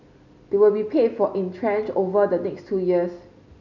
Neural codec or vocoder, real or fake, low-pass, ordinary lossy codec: none; real; 7.2 kHz; none